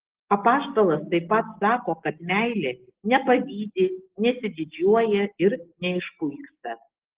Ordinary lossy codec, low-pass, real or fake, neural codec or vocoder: Opus, 16 kbps; 3.6 kHz; real; none